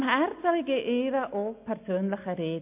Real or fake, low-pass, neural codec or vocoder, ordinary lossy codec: real; 3.6 kHz; none; MP3, 32 kbps